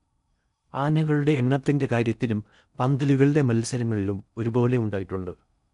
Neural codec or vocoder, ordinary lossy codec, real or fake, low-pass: codec, 16 kHz in and 24 kHz out, 0.6 kbps, FocalCodec, streaming, 4096 codes; MP3, 96 kbps; fake; 10.8 kHz